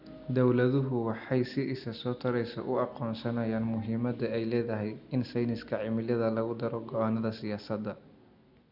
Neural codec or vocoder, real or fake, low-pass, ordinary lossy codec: none; real; 5.4 kHz; none